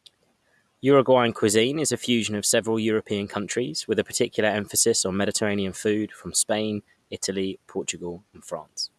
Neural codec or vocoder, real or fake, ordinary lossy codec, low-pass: none; real; none; none